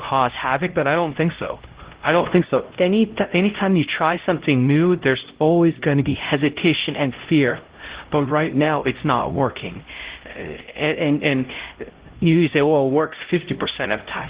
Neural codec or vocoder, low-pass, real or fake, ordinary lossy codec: codec, 16 kHz, 0.5 kbps, X-Codec, HuBERT features, trained on LibriSpeech; 3.6 kHz; fake; Opus, 16 kbps